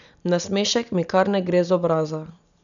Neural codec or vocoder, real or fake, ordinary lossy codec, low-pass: none; real; none; 7.2 kHz